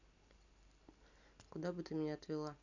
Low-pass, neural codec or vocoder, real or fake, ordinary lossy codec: 7.2 kHz; none; real; none